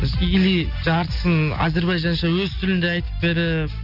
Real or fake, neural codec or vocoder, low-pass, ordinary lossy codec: real; none; 5.4 kHz; none